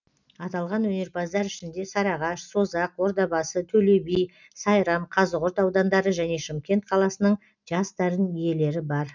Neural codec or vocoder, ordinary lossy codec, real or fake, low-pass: none; none; real; 7.2 kHz